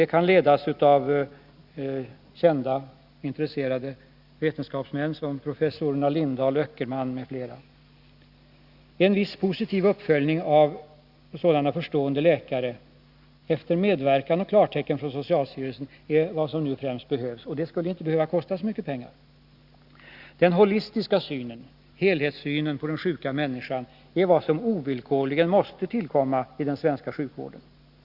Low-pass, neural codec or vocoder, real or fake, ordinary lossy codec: 5.4 kHz; none; real; Opus, 64 kbps